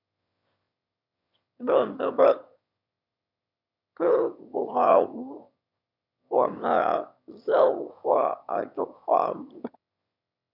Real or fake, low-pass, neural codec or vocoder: fake; 5.4 kHz; autoencoder, 22.05 kHz, a latent of 192 numbers a frame, VITS, trained on one speaker